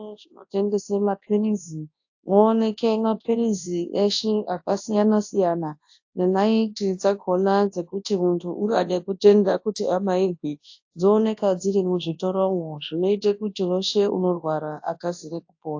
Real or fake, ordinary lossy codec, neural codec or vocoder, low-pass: fake; AAC, 48 kbps; codec, 24 kHz, 0.9 kbps, WavTokenizer, large speech release; 7.2 kHz